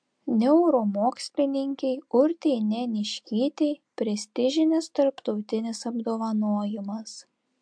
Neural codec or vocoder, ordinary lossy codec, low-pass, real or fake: none; MP3, 64 kbps; 9.9 kHz; real